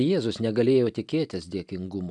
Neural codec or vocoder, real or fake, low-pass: none; real; 10.8 kHz